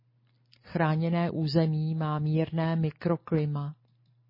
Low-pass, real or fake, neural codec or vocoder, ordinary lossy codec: 5.4 kHz; real; none; MP3, 24 kbps